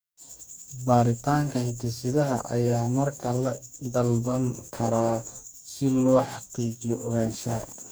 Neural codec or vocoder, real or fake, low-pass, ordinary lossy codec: codec, 44.1 kHz, 2.6 kbps, DAC; fake; none; none